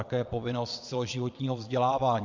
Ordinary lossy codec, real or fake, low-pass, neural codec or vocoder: MP3, 64 kbps; fake; 7.2 kHz; vocoder, 22.05 kHz, 80 mel bands, Vocos